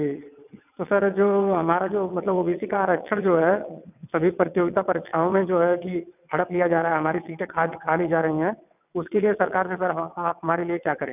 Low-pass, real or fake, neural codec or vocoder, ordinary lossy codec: 3.6 kHz; fake; vocoder, 22.05 kHz, 80 mel bands, WaveNeXt; none